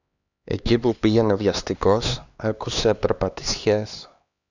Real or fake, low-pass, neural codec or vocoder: fake; 7.2 kHz; codec, 16 kHz, 2 kbps, X-Codec, HuBERT features, trained on LibriSpeech